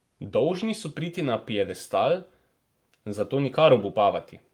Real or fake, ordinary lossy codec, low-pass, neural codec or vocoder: fake; Opus, 32 kbps; 19.8 kHz; codec, 44.1 kHz, 7.8 kbps, Pupu-Codec